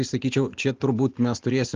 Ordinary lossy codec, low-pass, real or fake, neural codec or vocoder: Opus, 32 kbps; 7.2 kHz; real; none